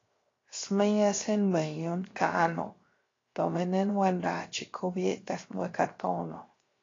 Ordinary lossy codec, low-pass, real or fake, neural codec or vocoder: AAC, 32 kbps; 7.2 kHz; fake; codec, 16 kHz, 0.7 kbps, FocalCodec